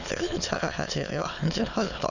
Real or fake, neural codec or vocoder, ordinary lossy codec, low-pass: fake; autoencoder, 22.05 kHz, a latent of 192 numbers a frame, VITS, trained on many speakers; none; 7.2 kHz